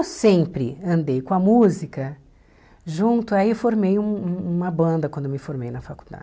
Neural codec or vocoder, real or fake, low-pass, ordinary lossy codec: none; real; none; none